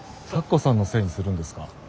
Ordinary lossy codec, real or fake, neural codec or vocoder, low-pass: none; real; none; none